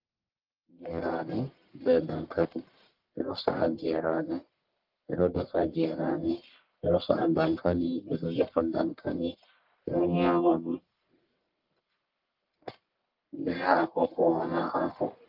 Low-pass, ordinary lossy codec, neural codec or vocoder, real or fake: 5.4 kHz; Opus, 24 kbps; codec, 44.1 kHz, 1.7 kbps, Pupu-Codec; fake